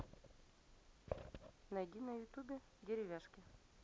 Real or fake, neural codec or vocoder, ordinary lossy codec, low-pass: real; none; none; none